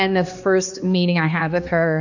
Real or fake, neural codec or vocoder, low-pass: fake; codec, 16 kHz, 2 kbps, X-Codec, HuBERT features, trained on balanced general audio; 7.2 kHz